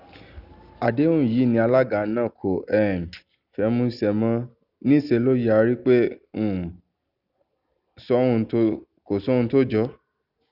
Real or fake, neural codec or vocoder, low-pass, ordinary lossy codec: real; none; 5.4 kHz; none